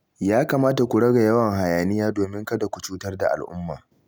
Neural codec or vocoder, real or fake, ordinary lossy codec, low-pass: none; real; none; none